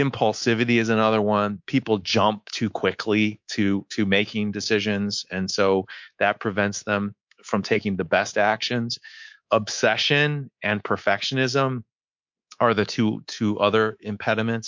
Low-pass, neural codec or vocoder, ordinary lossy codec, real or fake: 7.2 kHz; codec, 24 kHz, 3.1 kbps, DualCodec; MP3, 48 kbps; fake